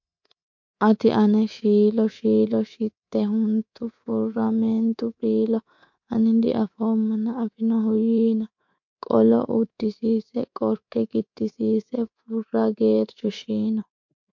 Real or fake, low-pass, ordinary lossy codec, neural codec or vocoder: fake; 7.2 kHz; MP3, 48 kbps; codec, 24 kHz, 3.1 kbps, DualCodec